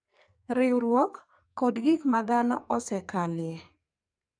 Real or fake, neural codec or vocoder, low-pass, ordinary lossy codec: fake; codec, 44.1 kHz, 2.6 kbps, SNAC; 9.9 kHz; none